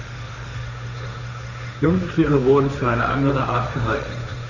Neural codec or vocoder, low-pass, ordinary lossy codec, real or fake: codec, 16 kHz, 1.1 kbps, Voila-Tokenizer; 7.2 kHz; none; fake